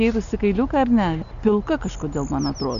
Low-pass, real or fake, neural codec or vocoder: 7.2 kHz; real; none